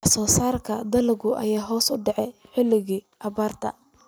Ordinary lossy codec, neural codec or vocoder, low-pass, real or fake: none; none; none; real